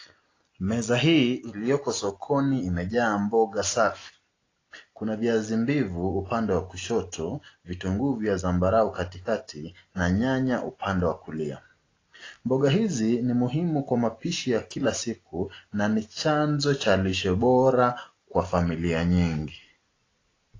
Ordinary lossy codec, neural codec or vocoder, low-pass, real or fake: AAC, 32 kbps; none; 7.2 kHz; real